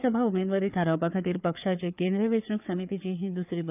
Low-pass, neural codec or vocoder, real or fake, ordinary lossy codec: 3.6 kHz; codec, 16 kHz, 4 kbps, FreqCodec, larger model; fake; none